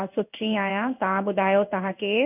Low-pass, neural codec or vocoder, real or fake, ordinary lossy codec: 3.6 kHz; codec, 16 kHz in and 24 kHz out, 1 kbps, XY-Tokenizer; fake; none